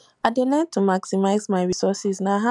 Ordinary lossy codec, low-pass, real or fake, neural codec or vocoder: none; 10.8 kHz; real; none